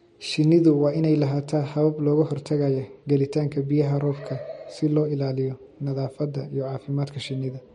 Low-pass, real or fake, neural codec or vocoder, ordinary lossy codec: 10.8 kHz; real; none; MP3, 48 kbps